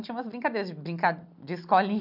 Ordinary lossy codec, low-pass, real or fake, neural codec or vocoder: none; 5.4 kHz; real; none